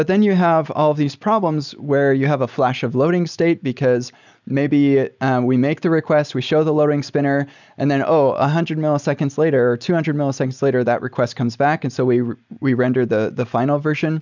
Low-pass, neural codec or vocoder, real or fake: 7.2 kHz; none; real